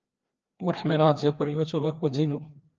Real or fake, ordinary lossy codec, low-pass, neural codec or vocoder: fake; Opus, 24 kbps; 7.2 kHz; codec, 16 kHz, 2 kbps, FreqCodec, larger model